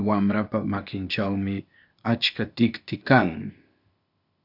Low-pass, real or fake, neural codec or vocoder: 5.4 kHz; fake; codec, 16 kHz, 0.8 kbps, ZipCodec